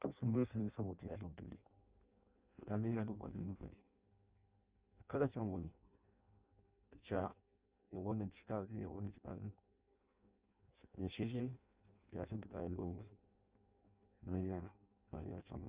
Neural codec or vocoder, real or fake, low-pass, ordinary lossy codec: codec, 16 kHz in and 24 kHz out, 0.6 kbps, FireRedTTS-2 codec; fake; 3.6 kHz; Opus, 24 kbps